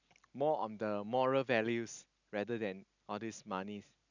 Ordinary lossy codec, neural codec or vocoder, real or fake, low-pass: none; none; real; 7.2 kHz